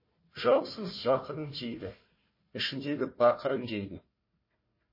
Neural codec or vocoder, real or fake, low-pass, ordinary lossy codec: codec, 16 kHz, 1 kbps, FunCodec, trained on Chinese and English, 50 frames a second; fake; 5.4 kHz; MP3, 24 kbps